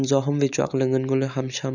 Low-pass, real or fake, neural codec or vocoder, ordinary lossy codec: 7.2 kHz; real; none; none